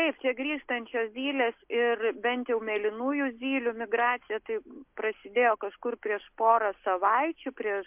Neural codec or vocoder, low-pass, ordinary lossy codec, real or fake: none; 3.6 kHz; MP3, 32 kbps; real